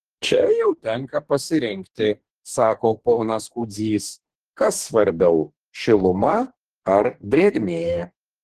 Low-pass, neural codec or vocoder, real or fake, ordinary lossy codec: 14.4 kHz; codec, 44.1 kHz, 2.6 kbps, DAC; fake; Opus, 16 kbps